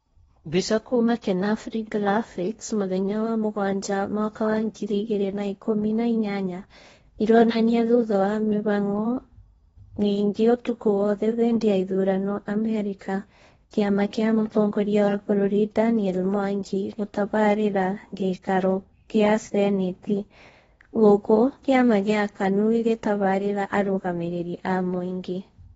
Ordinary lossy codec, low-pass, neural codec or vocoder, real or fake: AAC, 24 kbps; 10.8 kHz; codec, 16 kHz in and 24 kHz out, 0.8 kbps, FocalCodec, streaming, 65536 codes; fake